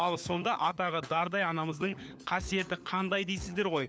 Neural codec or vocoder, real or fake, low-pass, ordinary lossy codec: codec, 16 kHz, 4 kbps, FunCodec, trained on LibriTTS, 50 frames a second; fake; none; none